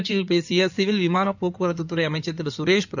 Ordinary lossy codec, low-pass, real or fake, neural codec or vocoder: none; 7.2 kHz; fake; codec, 16 kHz in and 24 kHz out, 2.2 kbps, FireRedTTS-2 codec